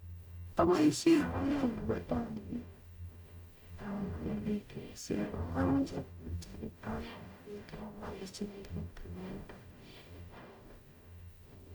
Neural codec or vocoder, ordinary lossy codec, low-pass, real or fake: codec, 44.1 kHz, 0.9 kbps, DAC; none; 19.8 kHz; fake